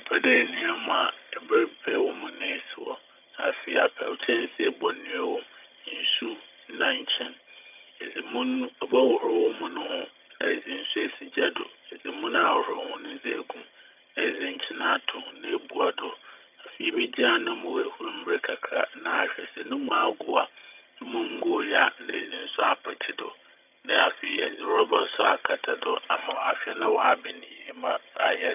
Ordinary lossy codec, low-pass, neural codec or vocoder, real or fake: none; 3.6 kHz; vocoder, 22.05 kHz, 80 mel bands, HiFi-GAN; fake